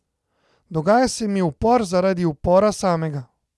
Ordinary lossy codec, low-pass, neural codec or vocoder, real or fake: none; none; none; real